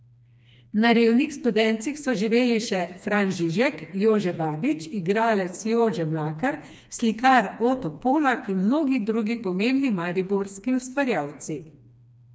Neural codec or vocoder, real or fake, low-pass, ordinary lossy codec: codec, 16 kHz, 2 kbps, FreqCodec, smaller model; fake; none; none